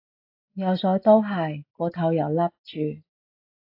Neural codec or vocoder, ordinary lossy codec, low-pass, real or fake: none; AAC, 32 kbps; 5.4 kHz; real